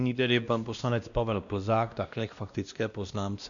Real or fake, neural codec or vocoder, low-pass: fake; codec, 16 kHz, 1 kbps, X-Codec, WavLM features, trained on Multilingual LibriSpeech; 7.2 kHz